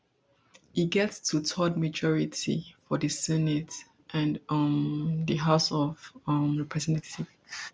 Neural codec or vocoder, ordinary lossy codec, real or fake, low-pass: none; none; real; none